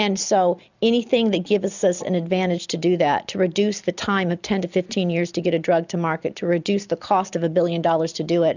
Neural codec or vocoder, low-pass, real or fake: none; 7.2 kHz; real